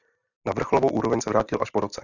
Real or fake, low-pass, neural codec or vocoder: real; 7.2 kHz; none